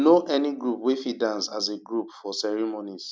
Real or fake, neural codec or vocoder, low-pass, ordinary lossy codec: real; none; none; none